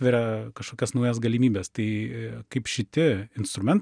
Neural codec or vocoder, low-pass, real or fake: none; 9.9 kHz; real